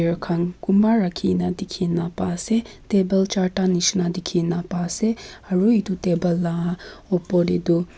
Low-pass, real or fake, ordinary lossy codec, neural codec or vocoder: none; real; none; none